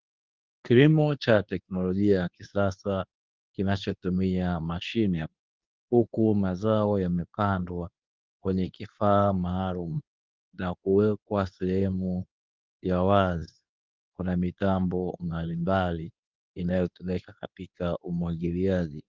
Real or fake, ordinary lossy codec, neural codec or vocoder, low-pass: fake; Opus, 32 kbps; codec, 24 kHz, 0.9 kbps, WavTokenizer, medium speech release version 2; 7.2 kHz